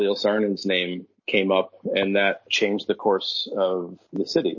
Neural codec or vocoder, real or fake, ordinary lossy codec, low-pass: none; real; MP3, 32 kbps; 7.2 kHz